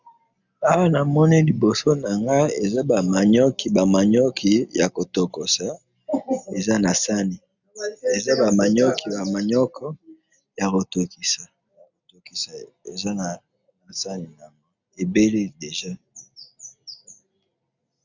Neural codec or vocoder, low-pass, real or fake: none; 7.2 kHz; real